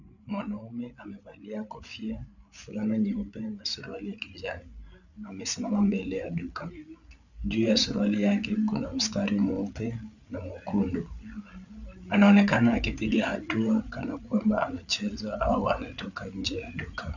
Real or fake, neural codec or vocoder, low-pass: fake; codec, 16 kHz, 8 kbps, FreqCodec, larger model; 7.2 kHz